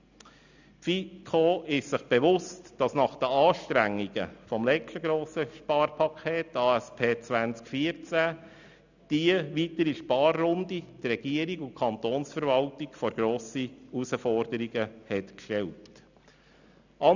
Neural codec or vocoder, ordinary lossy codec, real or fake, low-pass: none; none; real; 7.2 kHz